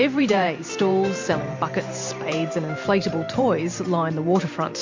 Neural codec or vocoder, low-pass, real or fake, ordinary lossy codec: vocoder, 44.1 kHz, 128 mel bands every 512 samples, BigVGAN v2; 7.2 kHz; fake; MP3, 48 kbps